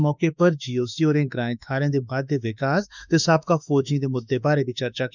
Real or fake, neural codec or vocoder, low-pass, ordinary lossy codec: fake; autoencoder, 48 kHz, 32 numbers a frame, DAC-VAE, trained on Japanese speech; 7.2 kHz; none